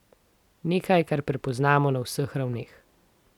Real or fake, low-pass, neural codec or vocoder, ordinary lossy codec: real; 19.8 kHz; none; none